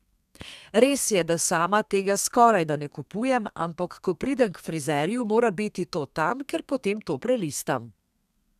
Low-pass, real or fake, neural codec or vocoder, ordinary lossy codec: 14.4 kHz; fake; codec, 32 kHz, 1.9 kbps, SNAC; none